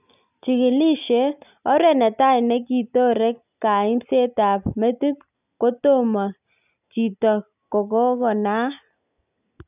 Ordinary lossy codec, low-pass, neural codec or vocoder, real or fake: none; 3.6 kHz; none; real